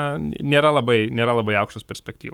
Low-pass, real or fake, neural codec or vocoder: 19.8 kHz; fake; codec, 44.1 kHz, 7.8 kbps, Pupu-Codec